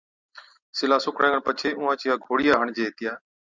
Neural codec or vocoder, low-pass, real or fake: none; 7.2 kHz; real